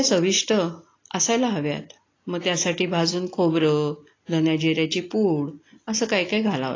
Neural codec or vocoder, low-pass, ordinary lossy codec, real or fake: none; 7.2 kHz; AAC, 32 kbps; real